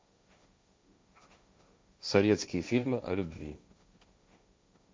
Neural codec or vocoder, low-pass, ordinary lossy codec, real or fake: codec, 16 kHz, 1.1 kbps, Voila-Tokenizer; none; none; fake